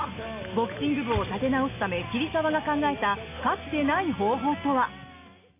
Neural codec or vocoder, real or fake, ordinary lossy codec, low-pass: none; real; AAC, 24 kbps; 3.6 kHz